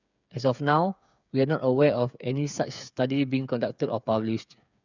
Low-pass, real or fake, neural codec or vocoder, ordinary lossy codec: 7.2 kHz; fake; codec, 16 kHz, 8 kbps, FreqCodec, smaller model; none